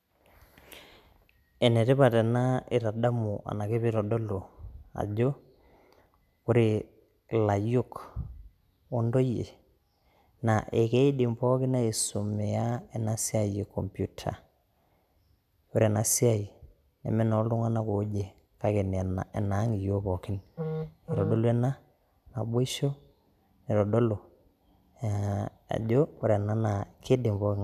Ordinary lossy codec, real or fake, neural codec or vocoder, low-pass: none; real; none; 14.4 kHz